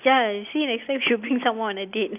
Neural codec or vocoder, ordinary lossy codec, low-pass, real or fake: none; none; 3.6 kHz; real